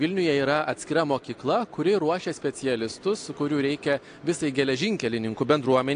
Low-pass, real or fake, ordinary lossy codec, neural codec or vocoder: 9.9 kHz; real; AAC, 48 kbps; none